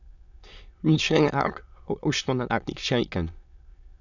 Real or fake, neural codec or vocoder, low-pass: fake; autoencoder, 22.05 kHz, a latent of 192 numbers a frame, VITS, trained on many speakers; 7.2 kHz